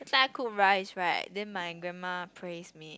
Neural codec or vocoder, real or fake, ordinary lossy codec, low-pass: none; real; none; none